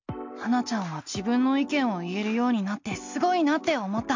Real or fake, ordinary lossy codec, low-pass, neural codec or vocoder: real; MP3, 48 kbps; 7.2 kHz; none